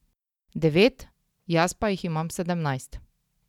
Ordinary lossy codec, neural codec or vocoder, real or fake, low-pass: none; none; real; 19.8 kHz